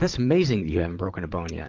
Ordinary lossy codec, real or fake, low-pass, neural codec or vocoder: Opus, 32 kbps; fake; 7.2 kHz; vocoder, 44.1 kHz, 128 mel bands every 512 samples, BigVGAN v2